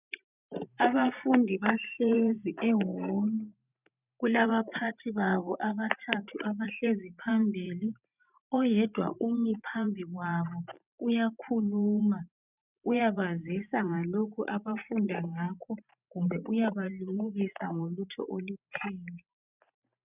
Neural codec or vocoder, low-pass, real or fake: vocoder, 44.1 kHz, 128 mel bands every 512 samples, BigVGAN v2; 3.6 kHz; fake